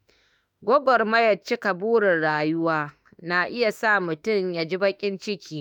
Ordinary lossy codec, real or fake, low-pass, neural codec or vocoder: none; fake; 19.8 kHz; autoencoder, 48 kHz, 32 numbers a frame, DAC-VAE, trained on Japanese speech